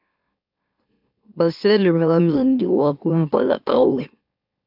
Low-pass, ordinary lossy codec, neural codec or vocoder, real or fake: 5.4 kHz; AAC, 48 kbps; autoencoder, 44.1 kHz, a latent of 192 numbers a frame, MeloTTS; fake